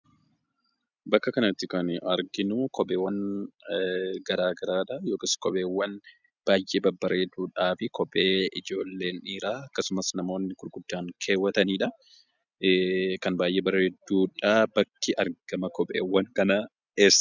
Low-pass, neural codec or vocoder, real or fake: 7.2 kHz; none; real